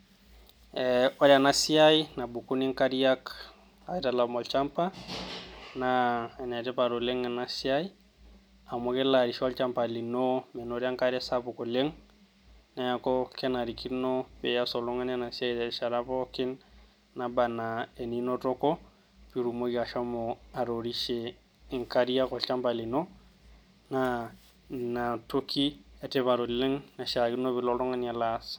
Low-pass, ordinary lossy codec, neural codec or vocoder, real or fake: none; none; none; real